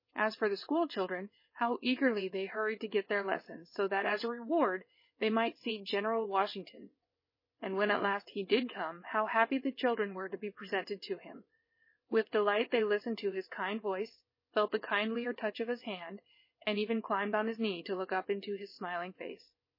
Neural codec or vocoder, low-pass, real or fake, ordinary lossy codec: vocoder, 22.05 kHz, 80 mel bands, WaveNeXt; 5.4 kHz; fake; MP3, 24 kbps